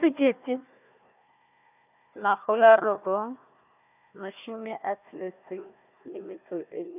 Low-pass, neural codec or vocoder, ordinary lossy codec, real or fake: 3.6 kHz; codec, 16 kHz, 1 kbps, FunCodec, trained on Chinese and English, 50 frames a second; none; fake